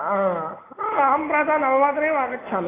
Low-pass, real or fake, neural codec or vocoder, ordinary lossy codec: 3.6 kHz; real; none; AAC, 16 kbps